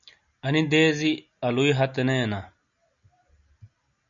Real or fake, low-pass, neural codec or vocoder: real; 7.2 kHz; none